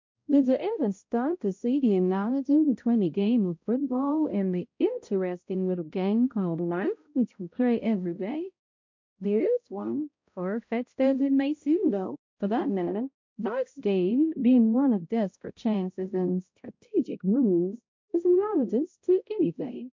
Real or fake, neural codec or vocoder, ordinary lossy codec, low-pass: fake; codec, 16 kHz, 0.5 kbps, X-Codec, HuBERT features, trained on balanced general audio; MP3, 48 kbps; 7.2 kHz